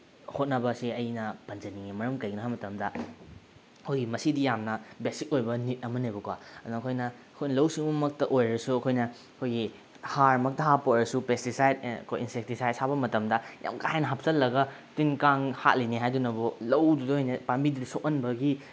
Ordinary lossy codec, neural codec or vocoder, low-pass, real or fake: none; none; none; real